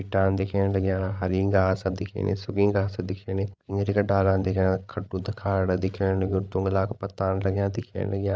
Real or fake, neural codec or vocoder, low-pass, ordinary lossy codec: fake; codec, 16 kHz, 8 kbps, FreqCodec, larger model; none; none